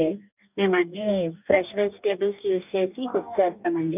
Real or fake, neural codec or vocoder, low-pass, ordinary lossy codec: fake; codec, 44.1 kHz, 2.6 kbps, DAC; 3.6 kHz; none